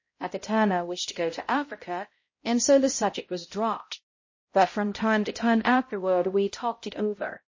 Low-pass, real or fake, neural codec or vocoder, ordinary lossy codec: 7.2 kHz; fake; codec, 16 kHz, 0.5 kbps, X-Codec, HuBERT features, trained on balanced general audio; MP3, 32 kbps